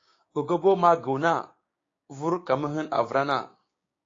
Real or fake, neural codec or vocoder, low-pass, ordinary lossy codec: fake; codec, 16 kHz, 6 kbps, DAC; 7.2 kHz; AAC, 32 kbps